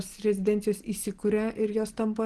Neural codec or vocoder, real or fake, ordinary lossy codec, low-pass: none; real; Opus, 16 kbps; 10.8 kHz